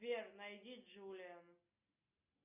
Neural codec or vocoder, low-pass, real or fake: none; 3.6 kHz; real